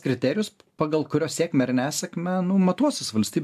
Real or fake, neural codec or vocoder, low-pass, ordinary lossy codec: real; none; 14.4 kHz; AAC, 96 kbps